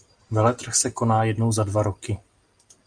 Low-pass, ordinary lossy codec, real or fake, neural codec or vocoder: 9.9 kHz; Opus, 32 kbps; real; none